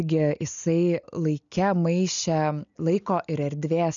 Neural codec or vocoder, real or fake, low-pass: none; real; 7.2 kHz